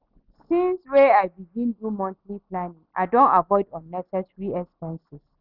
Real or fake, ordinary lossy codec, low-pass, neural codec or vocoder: real; none; 5.4 kHz; none